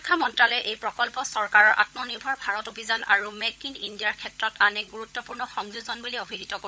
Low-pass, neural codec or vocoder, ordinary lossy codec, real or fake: none; codec, 16 kHz, 16 kbps, FunCodec, trained on LibriTTS, 50 frames a second; none; fake